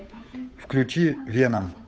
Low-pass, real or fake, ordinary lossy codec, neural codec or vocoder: none; fake; none; codec, 16 kHz, 8 kbps, FunCodec, trained on Chinese and English, 25 frames a second